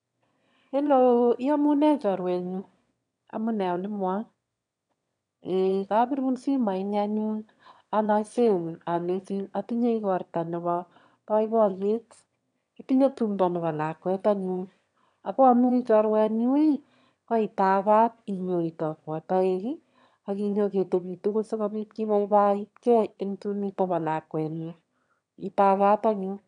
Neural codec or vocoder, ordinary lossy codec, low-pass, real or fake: autoencoder, 22.05 kHz, a latent of 192 numbers a frame, VITS, trained on one speaker; none; 9.9 kHz; fake